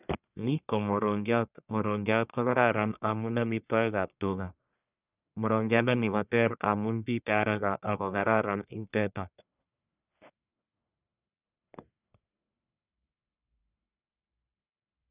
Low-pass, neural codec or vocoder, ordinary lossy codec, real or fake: 3.6 kHz; codec, 44.1 kHz, 1.7 kbps, Pupu-Codec; none; fake